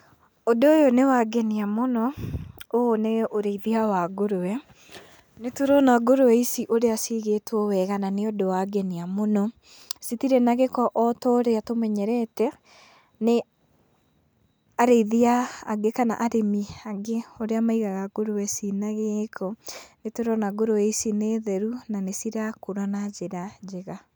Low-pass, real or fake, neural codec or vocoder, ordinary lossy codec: none; real; none; none